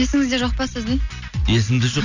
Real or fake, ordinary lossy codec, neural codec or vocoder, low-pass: real; none; none; 7.2 kHz